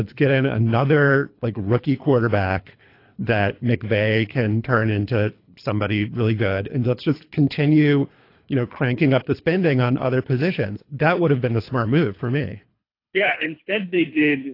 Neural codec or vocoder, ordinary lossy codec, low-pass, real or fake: codec, 24 kHz, 3 kbps, HILCodec; AAC, 32 kbps; 5.4 kHz; fake